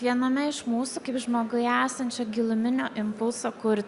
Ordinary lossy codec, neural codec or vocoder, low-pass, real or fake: Opus, 64 kbps; none; 10.8 kHz; real